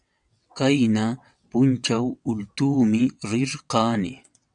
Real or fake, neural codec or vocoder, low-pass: fake; vocoder, 22.05 kHz, 80 mel bands, WaveNeXt; 9.9 kHz